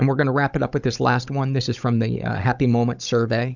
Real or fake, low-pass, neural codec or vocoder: fake; 7.2 kHz; codec, 16 kHz, 16 kbps, FunCodec, trained on Chinese and English, 50 frames a second